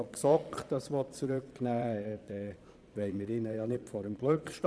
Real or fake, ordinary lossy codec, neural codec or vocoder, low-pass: fake; none; vocoder, 22.05 kHz, 80 mel bands, Vocos; none